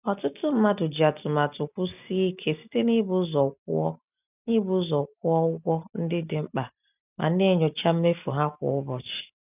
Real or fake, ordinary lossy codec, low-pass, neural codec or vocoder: real; none; 3.6 kHz; none